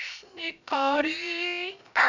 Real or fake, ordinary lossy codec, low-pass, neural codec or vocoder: fake; none; 7.2 kHz; codec, 16 kHz, 0.3 kbps, FocalCodec